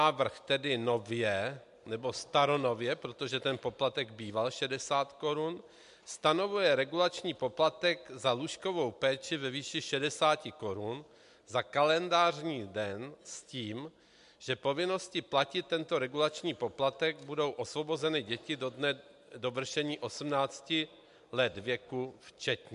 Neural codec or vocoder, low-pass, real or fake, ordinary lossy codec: none; 10.8 kHz; real; MP3, 64 kbps